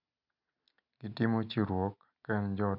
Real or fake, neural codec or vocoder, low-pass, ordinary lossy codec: real; none; 5.4 kHz; none